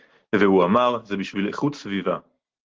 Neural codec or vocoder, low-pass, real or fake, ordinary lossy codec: none; 7.2 kHz; real; Opus, 16 kbps